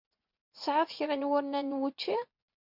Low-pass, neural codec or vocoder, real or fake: 5.4 kHz; vocoder, 24 kHz, 100 mel bands, Vocos; fake